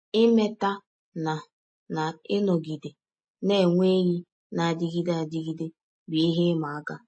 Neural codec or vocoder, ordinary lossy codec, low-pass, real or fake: none; MP3, 32 kbps; 9.9 kHz; real